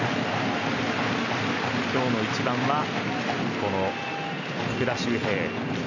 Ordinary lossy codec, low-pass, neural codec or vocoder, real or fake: none; 7.2 kHz; none; real